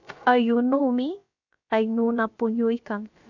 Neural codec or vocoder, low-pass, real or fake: codec, 16 kHz, about 1 kbps, DyCAST, with the encoder's durations; 7.2 kHz; fake